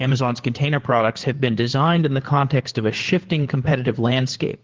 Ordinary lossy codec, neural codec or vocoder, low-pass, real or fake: Opus, 16 kbps; codec, 16 kHz in and 24 kHz out, 2.2 kbps, FireRedTTS-2 codec; 7.2 kHz; fake